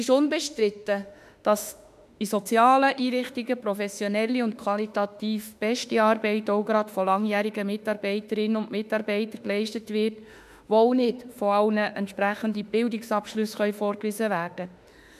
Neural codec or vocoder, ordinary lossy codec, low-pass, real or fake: autoencoder, 48 kHz, 32 numbers a frame, DAC-VAE, trained on Japanese speech; none; 14.4 kHz; fake